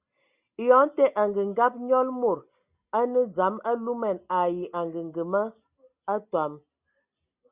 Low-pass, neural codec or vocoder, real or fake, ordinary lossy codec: 3.6 kHz; none; real; Opus, 64 kbps